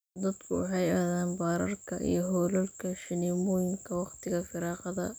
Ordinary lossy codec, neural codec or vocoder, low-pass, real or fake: none; none; none; real